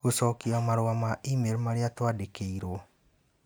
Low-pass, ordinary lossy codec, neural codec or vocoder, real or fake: none; none; none; real